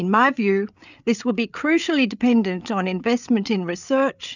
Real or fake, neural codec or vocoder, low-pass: fake; codec, 16 kHz, 8 kbps, FreqCodec, larger model; 7.2 kHz